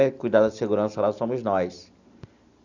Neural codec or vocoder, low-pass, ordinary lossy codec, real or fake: none; 7.2 kHz; none; real